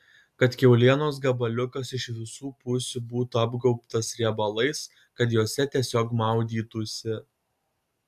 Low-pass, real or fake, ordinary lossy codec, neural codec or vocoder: 14.4 kHz; real; AAC, 96 kbps; none